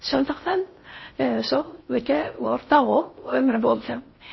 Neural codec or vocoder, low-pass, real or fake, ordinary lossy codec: codec, 16 kHz in and 24 kHz out, 0.6 kbps, FocalCodec, streaming, 4096 codes; 7.2 kHz; fake; MP3, 24 kbps